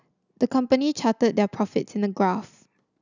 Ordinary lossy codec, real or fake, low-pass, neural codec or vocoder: none; real; 7.2 kHz; none